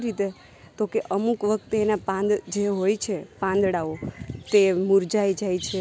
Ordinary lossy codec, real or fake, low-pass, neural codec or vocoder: none; real; none; none